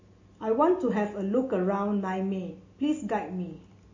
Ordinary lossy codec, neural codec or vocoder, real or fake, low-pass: MP3, 32 kbps; none; real; 7.2 kHz